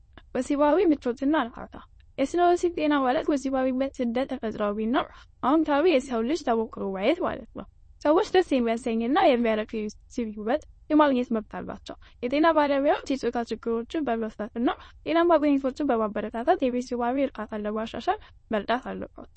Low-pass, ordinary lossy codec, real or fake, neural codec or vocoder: 9.9 kHz; MP3, 32 kbps; fake; autoencoder, 22.05 kHz, a latent of 192 numbers a frame, VITS, trained on many speakers